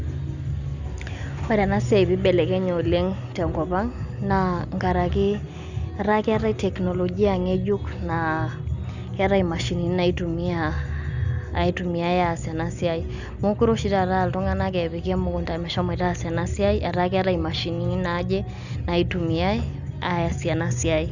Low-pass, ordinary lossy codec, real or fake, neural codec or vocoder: 7.2 kHz; none; real; none